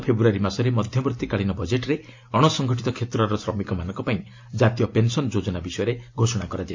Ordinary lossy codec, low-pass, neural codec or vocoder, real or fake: AAC, 48 kbps; 7.2 kHz; none; real